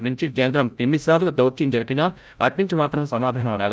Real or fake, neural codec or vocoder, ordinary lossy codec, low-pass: fake; codec, 16 kHz, 0.5 kbps, FreqCodec, larger model; none; none